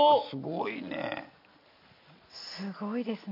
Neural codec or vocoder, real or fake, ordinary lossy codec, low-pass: none; real; MP3, 48 kbps; 5.4 kHz